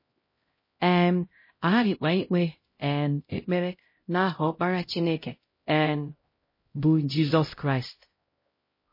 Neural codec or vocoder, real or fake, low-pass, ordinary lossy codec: codec, 16 kHz, 0.5 kbps, X-Codec, HuBERT features, trained on LibriSpeech; fake; 5.4 kHz; MP3, 24 kbps